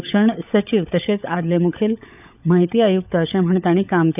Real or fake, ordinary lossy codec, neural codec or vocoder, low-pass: fake; none; codec, 16 kHz, 16 kbps, FunCodec, trained on LibriTTS, 50 frames a second; 3.6 kHz